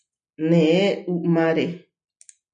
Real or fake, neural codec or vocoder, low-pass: real; none; 9.9 kHz